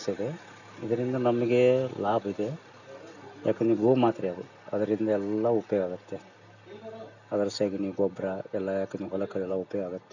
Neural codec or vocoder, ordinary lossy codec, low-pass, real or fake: none; none; 7.2 kHz; real